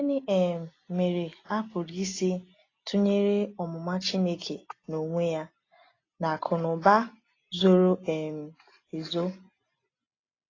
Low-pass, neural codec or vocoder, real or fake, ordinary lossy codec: 7.2 kHz; none; real; AAC, 32 kbps